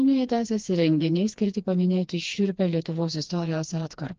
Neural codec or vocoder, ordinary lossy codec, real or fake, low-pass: codec, 16 kHz, 2 kbps, FreqCodec, smaller model; Opus, 24 kbps; fake; 7.2 kHz